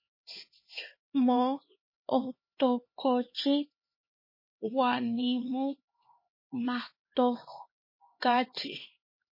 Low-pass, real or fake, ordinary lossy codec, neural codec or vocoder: 5.4 kHz; fake; MP3, 24 kbps; codec, 16 kHz, 2 kbps, X-Codec, HuBERT features, trained on LibriSpeech